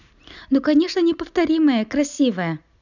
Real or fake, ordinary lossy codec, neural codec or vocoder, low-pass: real; none; none; 7.2 kHz